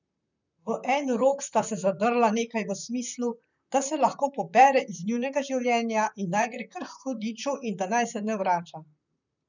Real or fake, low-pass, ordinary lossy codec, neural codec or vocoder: fake; 7.2 kHz; none; vocoder, 44.1 kHz, 128 mel bands, Pupu-Vocoder